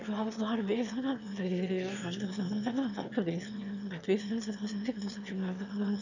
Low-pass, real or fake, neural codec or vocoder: 7.2 kHz; fake; autoencoder, 22.05 kHz, a latent of 192 numbers a frame, VITS, trained on one speaker